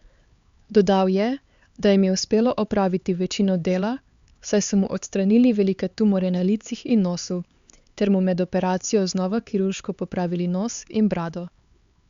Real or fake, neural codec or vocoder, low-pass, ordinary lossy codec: fake; codec, 16 kHz, 4 kbps, X-Codec, HuBERT features, trained on LibriSpeech; 7.2 kHz; Opus, 64 kbps